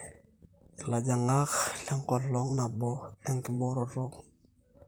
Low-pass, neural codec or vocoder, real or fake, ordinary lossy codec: none; vocoder, 44.1 kHz, 128 mel bands, Pupu-Vocoder; fake; none